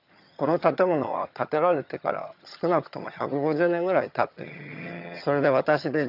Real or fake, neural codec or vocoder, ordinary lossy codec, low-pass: fake; vocoder, 22.05 kHz, 80 mel bands, HiFi-GAN; none; 5.4 kHz